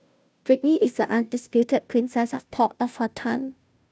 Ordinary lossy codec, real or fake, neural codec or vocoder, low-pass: none; fake; codec, 16 kHz, 0.5 kbps, FunCodec, trained on Chinese and English, 25 frames a second; none